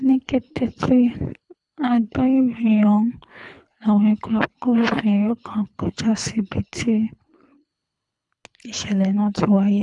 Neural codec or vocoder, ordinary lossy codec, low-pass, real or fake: codec, 24 kHz, 3 kbps, HILCodec; none; 10.8 kHz; fake